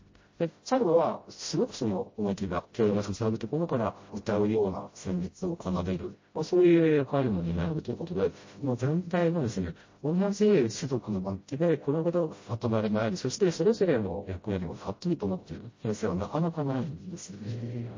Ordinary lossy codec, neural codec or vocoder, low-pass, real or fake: MP3, 32 kbps; codec, 16 kHz, 0.5 kbps, FreqCodec, smaller model; 7.2 kHz; fake